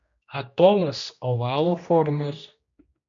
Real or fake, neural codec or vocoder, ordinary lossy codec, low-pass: fake; codec, 16 kHz, 1 kbps, X-Codec, HuBERT features, trained on general audio; MP3, 48 kbps; 7.2 kHz